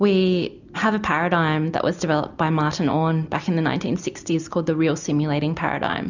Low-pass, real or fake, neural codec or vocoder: 7.2 kHz; real; none